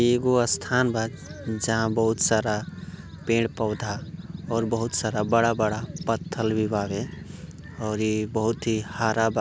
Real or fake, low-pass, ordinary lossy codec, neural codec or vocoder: real; none; none; none